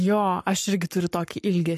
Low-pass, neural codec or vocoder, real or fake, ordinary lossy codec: 14.4 kHz; codec, 44.1 kHz, 7.8 kbps, Pupu-Codec; fake; MP3, 64 kbps